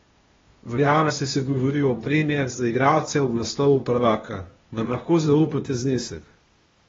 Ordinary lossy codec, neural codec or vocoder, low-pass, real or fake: AAC, 24 kbps; codec, 16 kHz, 0.8 kbps, ZipCodec; 7.2 kHz; fake